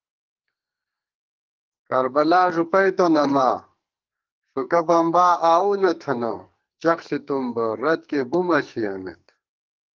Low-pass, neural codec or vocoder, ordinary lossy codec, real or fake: 7.2 kHz; codec, 44.1 kHz, 2.6 kbps, SNAC; Opus, 24 kbps; fake